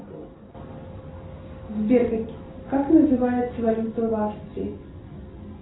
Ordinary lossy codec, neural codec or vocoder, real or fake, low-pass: AAC, 16 kbps; none; real; 7.2 kHz